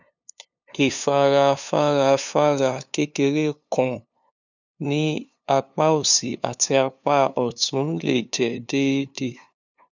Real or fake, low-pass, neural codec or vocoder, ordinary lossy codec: fake; 7.2 kHz; codec, 16 kHz, 2 kbps, FunCodec, trained on LibriTTS, 25 frames a second; none